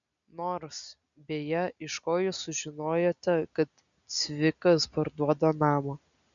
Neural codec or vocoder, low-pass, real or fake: none; 7.2 kHz; real